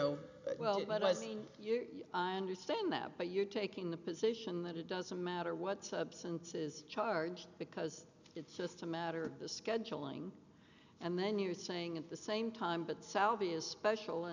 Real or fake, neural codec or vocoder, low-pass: real; none; 7.2 kHz